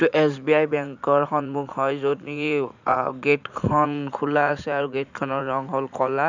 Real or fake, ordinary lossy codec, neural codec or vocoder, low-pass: fake; none; vocoder, 44.1 kHz, 80 mel bands, Vocos; 7.2 kHz